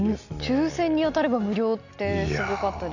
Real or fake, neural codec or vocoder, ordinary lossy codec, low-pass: real; none; none; 7.2 kHz